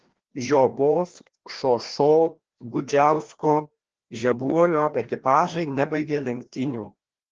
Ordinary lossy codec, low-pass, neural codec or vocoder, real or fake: Opus, 16 kbps; 7.2 kHz; codec, 16 kHz, 1 kbps, FreqCodec, larger model; fake